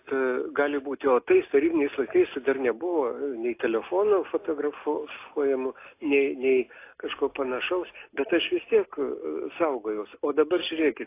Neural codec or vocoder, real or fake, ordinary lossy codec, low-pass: none; real; AAC, 24 kbps; 3.6 kHz